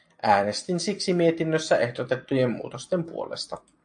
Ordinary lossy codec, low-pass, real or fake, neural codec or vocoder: AAC, 64 kbps; 9.9 kHz; real; none